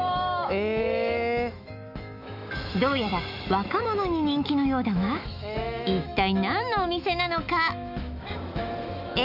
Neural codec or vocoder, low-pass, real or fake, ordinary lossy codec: autoencoder, 48 kHz, 128 numbers a frame, DAC-VAE, trained on Japanese speech; 5.4 kHz; fake; none